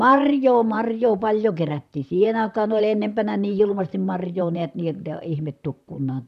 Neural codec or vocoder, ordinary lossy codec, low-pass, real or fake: vocoder, 44.1 kHz, 128 mel bands, Pupu-Vocoder; none; 14.4 kHz; fake